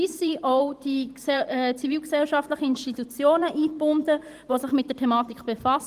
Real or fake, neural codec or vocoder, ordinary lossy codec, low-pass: fake; vocoder, 44.1 kHz, 128 mel bands every 512 samples, BigVGAN v2; Opus, 24 kbps; 14.4 kHz